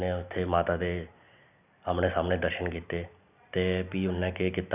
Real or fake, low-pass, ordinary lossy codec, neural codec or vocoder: real; 3.6 kHz; none; none